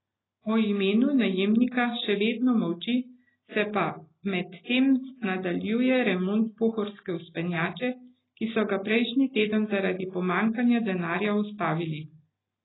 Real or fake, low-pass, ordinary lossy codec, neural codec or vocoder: real; 7.2 kHz; AAC, 16 kbps; none